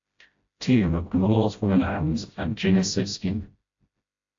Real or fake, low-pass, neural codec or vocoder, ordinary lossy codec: fake; 7.2 kHz; codec, 16 kHz, 0.5 kbps, FreqCodec, smaller model; AAC, 48 kbps